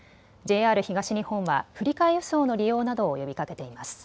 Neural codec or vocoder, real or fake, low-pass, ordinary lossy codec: none; real; none; none